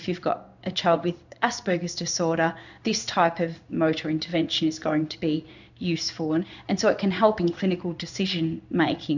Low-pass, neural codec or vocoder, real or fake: 7.2 kHz; codec, 16 kHz in and 24 kHz out, 1 kbps, XY-Tokenizer; fake